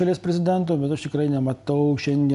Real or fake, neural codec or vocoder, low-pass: real; none; 10.8 kHz